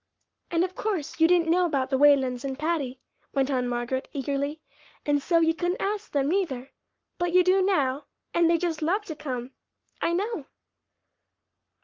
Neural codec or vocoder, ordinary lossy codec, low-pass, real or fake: codec, 44.1 kHz, 7.8 kbps, Pupu-Codec; Opus, 24 kbps; 7.2 kHz; fake